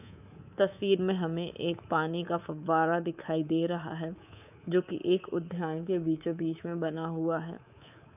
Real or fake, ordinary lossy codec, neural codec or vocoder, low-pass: fake; none; codec, 24 kHz, 3.1 kbps, DualCodec; 3.6 kHz